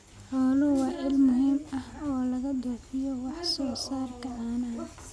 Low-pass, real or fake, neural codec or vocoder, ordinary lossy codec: none; real; none; none